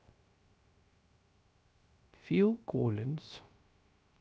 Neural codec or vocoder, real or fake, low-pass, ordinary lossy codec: codec, 16 kHz, 0.3 kbps, FocalCodec; fake; none; none